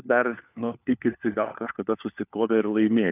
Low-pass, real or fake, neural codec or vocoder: 3.6 kHz; fake; codec, 16 kHz, 4 kbps, FunCodec, trained on LibriTTS, 50 frames a second